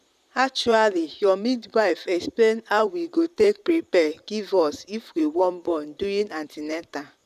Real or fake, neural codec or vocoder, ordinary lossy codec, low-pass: fake; vocoder, 44.1 kHz, 128 mel bands, Pupu-Vocoder; none; 14.4 kHz